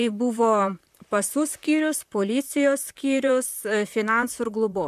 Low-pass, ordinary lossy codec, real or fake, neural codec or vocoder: 14.4 kHz; MP3, 96 kbps; fake; vocoder, 44.1 kHz, 128 mel bands, Pupu-Vocoder